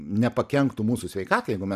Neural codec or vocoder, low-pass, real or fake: none; 14.4 kHz; real